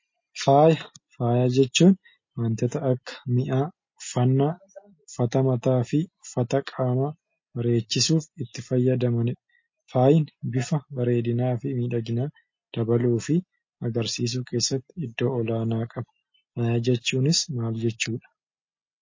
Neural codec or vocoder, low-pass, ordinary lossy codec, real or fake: none; 7.2 kHz; MP3, 32 kbps; real